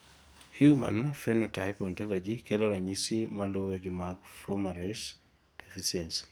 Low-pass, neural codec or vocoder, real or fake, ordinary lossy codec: none; codec, 44.1 kHz, 2.6 kbps, SNAC; fake; none